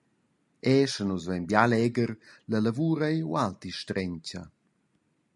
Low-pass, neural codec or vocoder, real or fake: 10.8 kHz; none; real